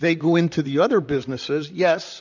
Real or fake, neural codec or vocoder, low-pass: fake; vocoder, 44.1 kHz, 128 mel bands, Pupu-Vocoder; 7.2 kHz